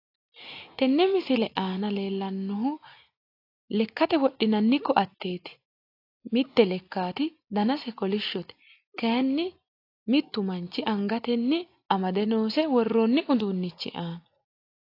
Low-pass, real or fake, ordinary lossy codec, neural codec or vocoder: 5.4 kHz; real; AAC, 32 kbps; none